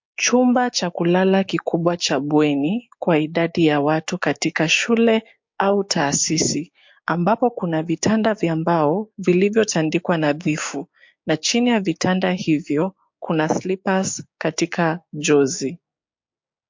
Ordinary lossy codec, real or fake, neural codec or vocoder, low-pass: MP3, 48 kbps; fake; codec, 16 kHz, 6 kbps, DAC; 7.2 kHz